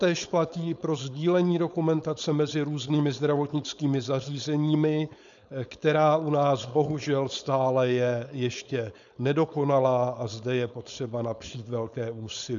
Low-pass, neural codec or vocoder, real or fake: 7.2 kHz; codec, 16 kHz, 4.8 kbps, FACodec; fake